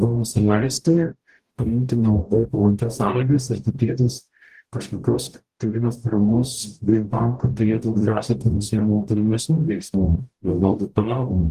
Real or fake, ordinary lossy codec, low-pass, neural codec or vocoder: fake; Opus, 24 kbps; 14.4 kHz; codec, 44.1 kHz, 0.9 kbps, DAC